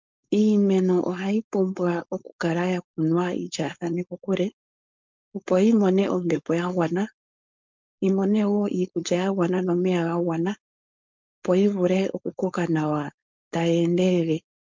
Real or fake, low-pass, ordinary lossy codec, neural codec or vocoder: fake; 7.2 kHz; MP3, 64 kbps; codec, 16 kHz, 4.8 kbps, FACodec